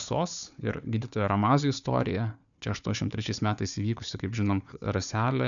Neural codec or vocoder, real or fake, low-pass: codec, 16 kHz, 4 kbps, FunCodec, trained on Chinese and English, 50 frames a second; fake; 7.2 kHz